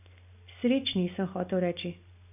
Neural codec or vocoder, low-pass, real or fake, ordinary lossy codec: none; 3.6 kHz; real; none